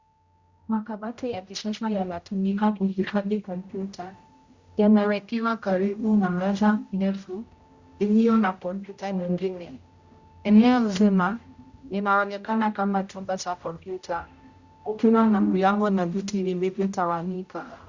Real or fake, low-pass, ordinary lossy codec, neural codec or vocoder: fake; 7.2 kHz; Opus, 64 kbps; codec, 16 kHz, 0.5 kbps, X-Codec, HuBERT features, trained on general audio